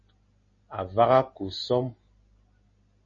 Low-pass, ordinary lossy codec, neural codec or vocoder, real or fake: 7.2 kHz; MP3, 32 kbps; none; real